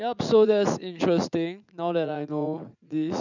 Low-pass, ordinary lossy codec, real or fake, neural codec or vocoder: 7.2 kHz; none; fake; vocoder, 44.1 kHz, 80 mel bands, Vocos